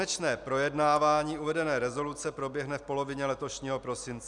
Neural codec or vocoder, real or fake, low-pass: none; real; 10.8 kHz